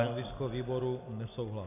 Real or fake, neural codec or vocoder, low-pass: real; none; 3.6 kHz